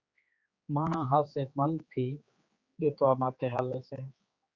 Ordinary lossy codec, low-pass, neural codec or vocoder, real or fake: Opus, 64 kbps; 7.2 kHz; codec, 16 kHz, 4 kbps, X-Codec, HuBERT features, trained on general audio; fake